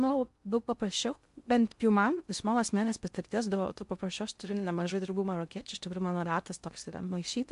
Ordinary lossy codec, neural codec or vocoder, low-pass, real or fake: MP3, 64 kbps; codec, 16 kHz in and 24 kHz out, 0.6 kbps, FocalCodec, streaming, 2048 codes; 10.8 kHz; fake